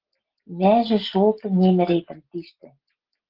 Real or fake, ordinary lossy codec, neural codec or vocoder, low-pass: real; Opus, 16 kbps; none; 5.4 kHz